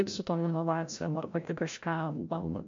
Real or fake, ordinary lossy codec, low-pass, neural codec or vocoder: fake; MP3, 48 kbps; 7.2 kHz; codec, 16 kHz, 0.5 kbps, FreqCodec, larger model